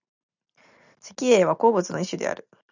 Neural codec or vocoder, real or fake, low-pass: none; real; 7.2 kHz